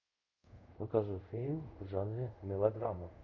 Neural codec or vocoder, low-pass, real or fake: codec, 24 kHz, 0.5 kbps, DualCodec; 7.2 kHz; fake